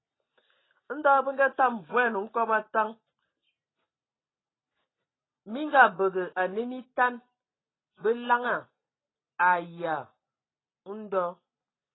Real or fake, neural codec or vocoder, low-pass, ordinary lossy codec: real; none; 7.2 kHz; AAC, 16 kbps